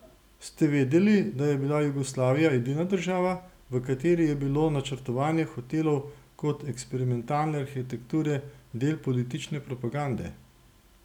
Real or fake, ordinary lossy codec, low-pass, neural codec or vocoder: real; none; 19.8 kHz; none